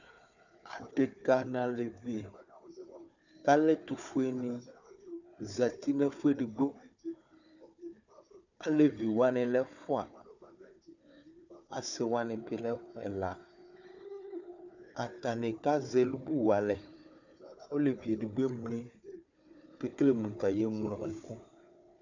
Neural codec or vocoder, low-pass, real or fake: codec, 16 kHz, 4 kbps, FunCodec, trained on Chinese and English, 50 frames a second; 7.2 kHz; fake